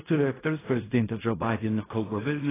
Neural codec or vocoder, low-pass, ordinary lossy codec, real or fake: codec, 16 kHz in and 24 kHz out, 0.4 kbps, LongCat-Audio-Codec, fine tuned four codebook decoder; 3.6 kHz; AAC, 16 kbps; fake